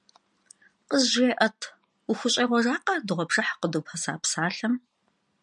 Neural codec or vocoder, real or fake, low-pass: none; real; 10.8 kHz